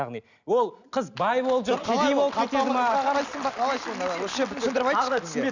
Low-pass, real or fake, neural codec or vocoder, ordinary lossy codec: 7.2 kHz; real; none; none